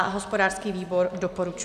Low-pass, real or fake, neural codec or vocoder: 14.4 kHz; real; none